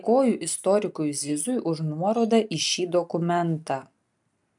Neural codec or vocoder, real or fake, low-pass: none; real; 10.8 kHz